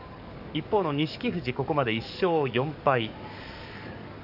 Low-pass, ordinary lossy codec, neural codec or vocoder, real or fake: 5.4 kHz; none; codec, 44.1 kHz, 7.8 kbps, DAC; fake